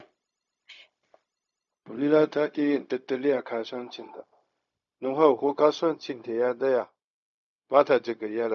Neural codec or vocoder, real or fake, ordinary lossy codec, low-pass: codec, 16 kHz, 0.4 kbps, LongCat-Audio-Codec; fake; none; 7.2 kHz